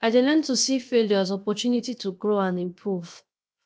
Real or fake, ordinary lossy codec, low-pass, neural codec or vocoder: fake; none; none; codec, 16 kHz, 0.7 kbps, FocalCodec